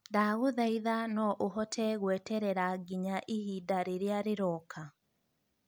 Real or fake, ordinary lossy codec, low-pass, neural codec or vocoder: real; none; none; none